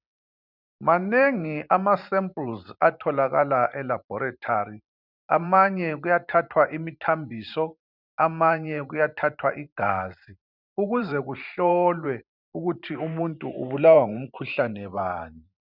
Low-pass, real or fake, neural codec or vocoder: 5.4 kHz; fake; vocoder, 44.1 kHz, 128 mel bands every 512 samples, BigVGAN v2